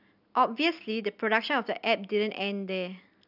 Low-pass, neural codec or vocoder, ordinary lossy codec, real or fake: 5.4 kHz; none; none; real